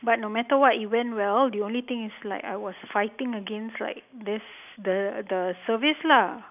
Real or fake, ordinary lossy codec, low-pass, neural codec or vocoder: real; none; 3.6 kHz; none